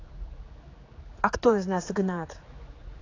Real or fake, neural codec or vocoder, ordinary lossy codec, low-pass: fake; codec, 16 kHz, 4 kbps, X-Codec, HuBERT features, trained on general audio; AAC, 32 kbps; 7.2 kHz